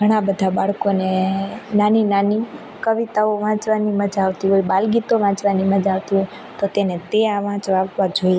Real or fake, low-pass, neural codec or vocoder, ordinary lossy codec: real; none; none; none